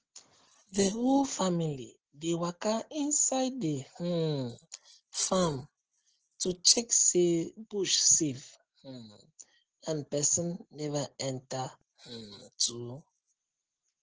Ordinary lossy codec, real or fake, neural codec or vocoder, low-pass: Opus, 16 kbps; real; none; 7.2 kHz